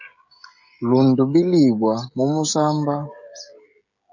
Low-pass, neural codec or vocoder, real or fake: 7.2 kHz; codec, 16 kHz, 16 kbps, FreqCodec, smaller model; fake